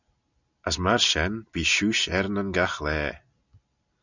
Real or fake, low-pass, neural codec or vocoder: real; 7.2 kHz; none